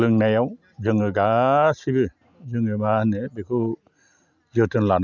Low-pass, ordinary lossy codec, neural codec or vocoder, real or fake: 7.2 kHz; none; none; real